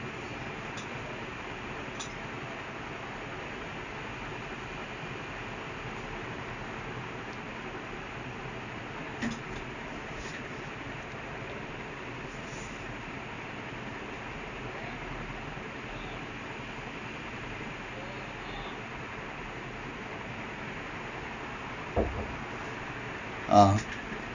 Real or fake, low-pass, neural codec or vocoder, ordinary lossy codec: real; 7.2 kHz; none; none